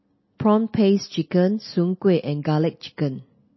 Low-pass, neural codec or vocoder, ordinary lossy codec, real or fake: 7.2 kHz; none; MP3, 24 kbps; real